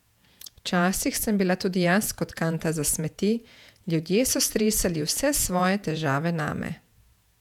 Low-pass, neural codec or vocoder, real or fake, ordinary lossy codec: 19.8 kHz; vocoder, 48 kHz, 128 mel bands, Vocos; fake; none